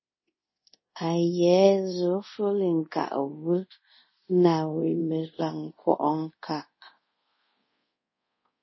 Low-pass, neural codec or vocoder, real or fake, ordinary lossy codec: 7.2 kHz; codec, 24 kHz, 0.5 kbps, DualCodec; fake; MP3, 24 kbps